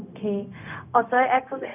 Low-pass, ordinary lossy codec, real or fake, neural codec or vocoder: 3.6 kHz; none; fake; codec, 16 kHz, 0.4 kbps, LongCat-Audio-Codec